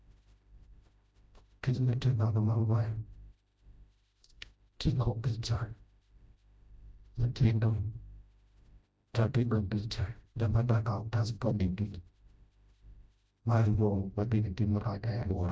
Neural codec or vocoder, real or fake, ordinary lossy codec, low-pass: codec, 16 kHz, 0.5 kbps, FreqCodec, smaller model; fake; none; none